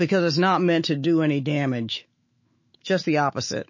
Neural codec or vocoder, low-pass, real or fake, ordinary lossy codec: autoencoder, 48 kHz, 128 numbers a frame, DAC-VAE, trained on Japanese speech; 7.2 kHz; fake; MP3, 32 kbps